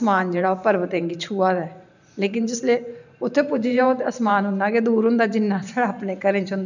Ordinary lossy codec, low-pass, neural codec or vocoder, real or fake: none; 7.2 kHz; vocoder, 44.1 kHz, 128 mel bands every 512 samples, BigVGAN v2; fake